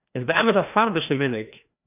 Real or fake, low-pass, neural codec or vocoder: fake; 3.6 kHz; codec, 16 kHz, 1 kbps, FreqCodec, larger model